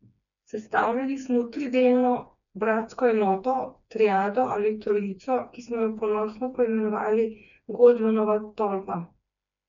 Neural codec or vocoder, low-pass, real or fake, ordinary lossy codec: codec, 16 kHz, 2 kbps, FreqCodec, smaller model; 7.2 kHz; fake; none